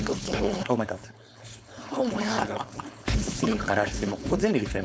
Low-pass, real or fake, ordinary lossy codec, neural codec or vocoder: none; fake; none; codec, 16 kHz, 4.8 kbps, FACodec